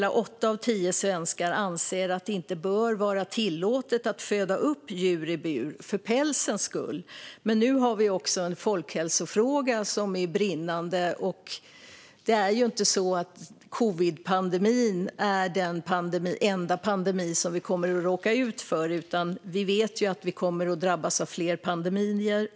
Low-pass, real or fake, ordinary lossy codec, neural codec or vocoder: none; real; none; none